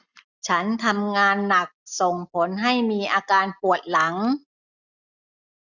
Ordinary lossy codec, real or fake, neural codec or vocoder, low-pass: none; real; none; 7.2 kHz